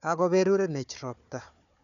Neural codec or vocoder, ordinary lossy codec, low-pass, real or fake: codec, 16 kHz, 4 kbps, FunCodec, trained on Chinese and English, 50 frames a second; none; 7.2 kHz; fake